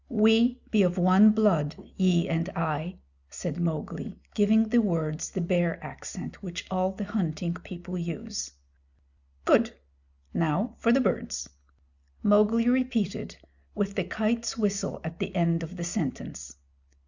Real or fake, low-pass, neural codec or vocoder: real; 7.2 kHz; none